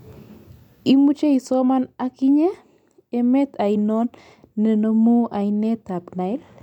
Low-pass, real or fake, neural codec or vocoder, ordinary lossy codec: 19.8 kHz; real; none; none